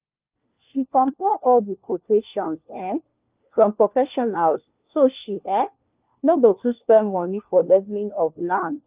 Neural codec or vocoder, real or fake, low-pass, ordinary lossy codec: codec, 16 kHz, 1 kbps, FunCodec, trained on LibriTTS, 50 frames a second; fake; 3.6 kHz; Opus, 24 kbps